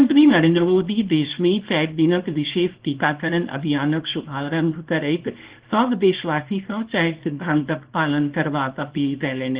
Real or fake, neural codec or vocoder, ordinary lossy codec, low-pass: fake; codec, 24 kHz, 0.9 kbps, WavTokenizer, small release; Opus, 16 kbps; 3.6 kHz